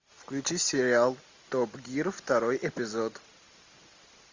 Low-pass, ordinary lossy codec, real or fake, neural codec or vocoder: 7.2 kHz; MP3, 64 kbps; real; none